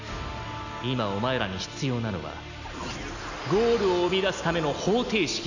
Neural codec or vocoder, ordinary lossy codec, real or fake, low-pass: none; none; real; 7.2 kHz